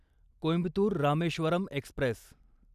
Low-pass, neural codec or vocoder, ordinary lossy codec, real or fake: 14.4 kHz; none; none; real